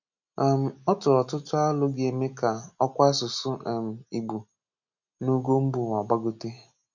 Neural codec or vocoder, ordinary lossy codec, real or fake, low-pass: none; none; real; 7.2 kHz